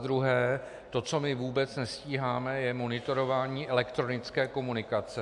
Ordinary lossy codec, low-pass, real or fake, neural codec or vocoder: AAC, 64 kbps; 10.8 kHz; real; none